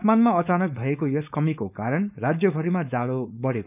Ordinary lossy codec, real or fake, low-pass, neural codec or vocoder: none; fake; 3.6 kHz; codec, 16 kHz, 4.8 kbps, FACodec